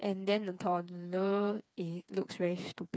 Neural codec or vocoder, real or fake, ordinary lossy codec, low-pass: codec, 16 kHz, 4 kbps, FreqCodec, smaller model; fake; none; none